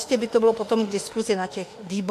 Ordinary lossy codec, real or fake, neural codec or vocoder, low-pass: AAC, 64 kbps; fake; autoencoder, 48 kHz, 32 numbers a frame, DAC-VAE, trained on Japanese speech; 14.4 kHz